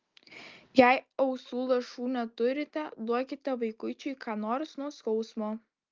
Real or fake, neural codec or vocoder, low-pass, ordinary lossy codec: real; none; 7.2 kHz; Opus, 32 kbps